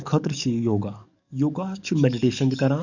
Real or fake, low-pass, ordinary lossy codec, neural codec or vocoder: fake; 7.2 kHz; none; codec, 44.1 kHz, 7.8 kbps, DAC